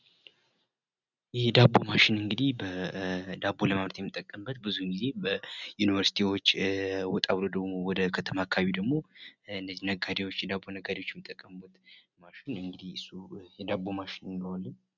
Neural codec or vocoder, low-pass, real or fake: none; 7.2 kHz; real